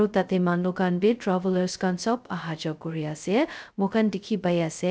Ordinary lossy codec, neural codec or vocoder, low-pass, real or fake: none; codec, 16 kHz, 0.2 kbps, FocalCodec; none; fake